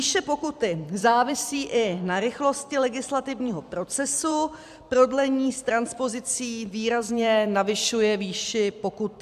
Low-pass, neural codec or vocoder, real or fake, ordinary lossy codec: 14.4 kHz; vocoder, 44.1 kHz, 128 mel bands every 256 samples, BigVGAN v2; fake; AAC, 96 kbps